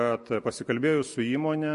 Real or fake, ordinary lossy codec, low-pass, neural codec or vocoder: real; MP3, 48 kbps; 10.8 kHz; none